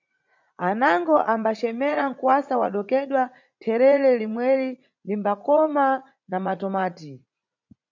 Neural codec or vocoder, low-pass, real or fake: vocoder, 44.1 kHz, 80 mel bands, Vocos; 7.2 kHz; fake